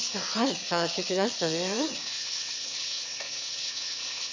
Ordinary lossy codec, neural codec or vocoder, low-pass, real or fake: MP3, 48 kbps; autoencoder, 22.05 kHz, a latent of 192 numbers a frame, VITS, trained on one speaker; 7.2 kHz; fake